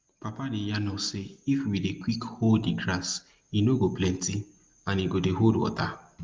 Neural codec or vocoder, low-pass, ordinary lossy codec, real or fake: none; 7.2 kHz; Opus, 32 kbps; real